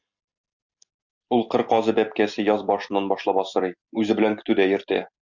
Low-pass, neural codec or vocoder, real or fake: 7.2 kHz; none; real